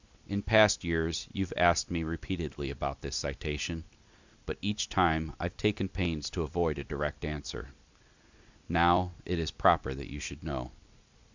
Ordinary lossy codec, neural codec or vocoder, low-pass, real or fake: Opus, 64 kbps; none; 7.2 kHz; real